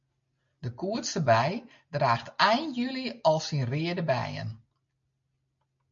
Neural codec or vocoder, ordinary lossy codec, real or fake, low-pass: none; MP3, 48 kbps; real; 7.2 kHz